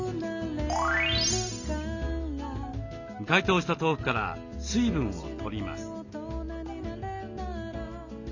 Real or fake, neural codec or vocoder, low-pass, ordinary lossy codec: real; none; 7.2 kHz; none